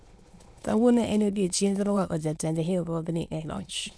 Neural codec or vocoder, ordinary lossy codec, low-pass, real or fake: autoencoder, 22.05 kHz, a latent of 192 numbers a frame, VITS, trained on many speakers; none; none; fake